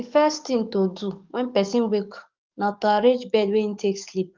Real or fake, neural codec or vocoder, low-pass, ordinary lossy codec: fake; codec, 44.1 kHz, 7.8 kbps, DAC; 7.2 kHz; Opus, 32 kbps